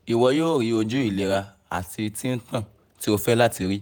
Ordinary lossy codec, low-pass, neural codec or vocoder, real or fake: none; none; vocoder, 48 kHz, 128 mel bands, Vocos; fake